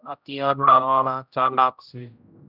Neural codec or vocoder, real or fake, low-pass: codec, 16 kHz, 0.5 kbps, X-Codec, HuBERT features, trained on general audio; fake; 5.4 kHz